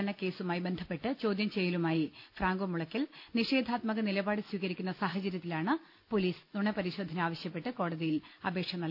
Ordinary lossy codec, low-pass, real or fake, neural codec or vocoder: none; 5.4 kHz; real; none